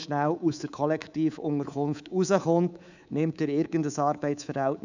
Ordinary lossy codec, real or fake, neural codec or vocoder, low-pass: none; fake; codec, 24 kHz, 3.1 kbps, DualCodec; 7.2 kHz